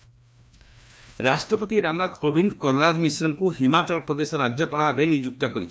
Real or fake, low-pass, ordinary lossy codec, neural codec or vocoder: fake; none; none; codec, 16 kHz, 1 kbps, FreqCodec, larger model